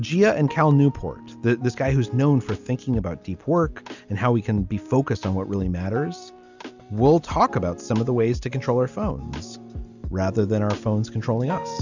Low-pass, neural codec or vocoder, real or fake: 7.2 kHz; none; real